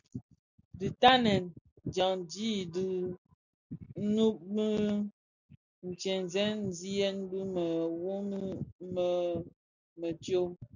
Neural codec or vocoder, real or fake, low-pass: none; real; 7.2 kHz